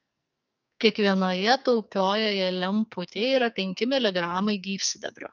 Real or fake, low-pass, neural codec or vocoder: fake; 7.2 kHz; codec, 44.1 kHz, 2.6 kbps, SNAC